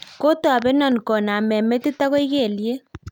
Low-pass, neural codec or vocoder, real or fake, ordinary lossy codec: 19.8 kHz; none; real; none